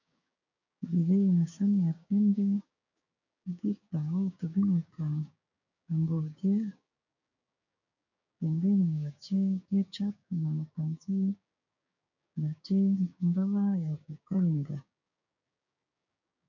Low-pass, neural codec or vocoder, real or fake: 7.2 kHz; codec, 16 kHz, 6 kbps, DAC; fake